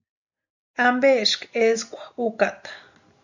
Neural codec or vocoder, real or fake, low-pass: none; real; 7.2 kHz